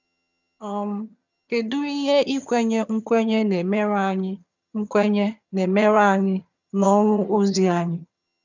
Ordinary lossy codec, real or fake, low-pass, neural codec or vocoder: none; fake; 7.2 kHz; vocoder, 22.05 kHz, 80 mel bands, HiFi-GAN